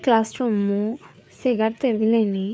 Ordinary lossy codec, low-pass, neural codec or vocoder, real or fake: none; none; codec, 16 kHz, 4 kbps, FreqCodec, larger model; fake